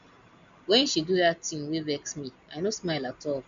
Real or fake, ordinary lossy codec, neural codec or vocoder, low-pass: real; MP3, 64 kbps; none; 7.2 kHz